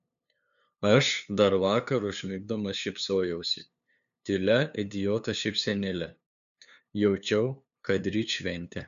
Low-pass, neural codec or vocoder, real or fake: 7.2 kHz; codec, 16 kHz, 2 kbps, FunCodec, trained on LibriTTS, 25 frames a second; fake